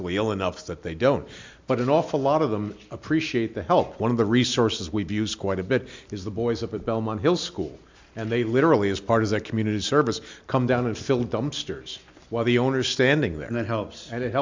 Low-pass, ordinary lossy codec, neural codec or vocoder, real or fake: 7.2 kHz; MP3, 64 kbps; none; real